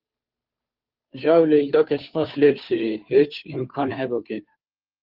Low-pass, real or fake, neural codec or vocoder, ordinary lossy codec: 5.4 kHz; fake; codec, 16 kHz, 2 kbps, FunCodec, trained on Chinese and English, 25 frames a second; Opus, 24 kbps